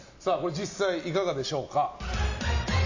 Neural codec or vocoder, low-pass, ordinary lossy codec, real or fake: none; 7.2 kHz; AAC, 48 kbps; real